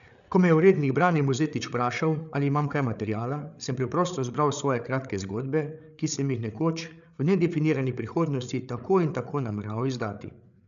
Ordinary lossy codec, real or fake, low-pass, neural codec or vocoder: none; fake; 7.2 kHz; codec, 16 kHz, 8 kbps, FreqCodec, larger model